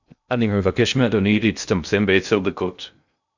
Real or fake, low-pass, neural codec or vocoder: fake; 7.2 kHz; codec, 16 kHz in and 24 kHz out, 0.6 kbps, FocalCodec, streaming, 2048 codes